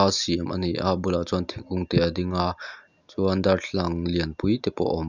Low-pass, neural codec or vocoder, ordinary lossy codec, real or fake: 7.2 kHz; none; none; real